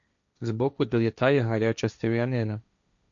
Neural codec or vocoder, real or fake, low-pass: codec, 16 kHz, 1.1 kbps, Voila-Tokenizer; fake; 7.2 kHz